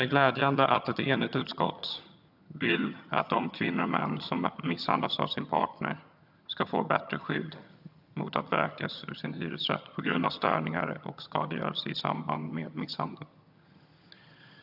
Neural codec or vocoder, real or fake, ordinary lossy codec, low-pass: vocoder, 22.05 kHz, 80 mel bands, HiFi-GAN; fake; AAC, 48 kbps; 5.4 kHz